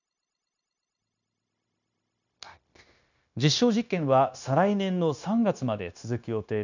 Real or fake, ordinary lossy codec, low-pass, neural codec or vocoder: fake; none; 7.2 kHz; codec, 16 kHz, 0.9 kbps, LongCat-Audio-Codec